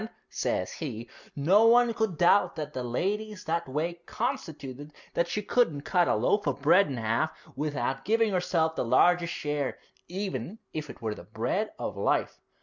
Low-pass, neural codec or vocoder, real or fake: 7.2 kHz; none; real